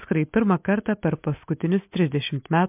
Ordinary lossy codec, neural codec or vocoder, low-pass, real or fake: MP3, 32 kbps; none; 3.6 kHz; real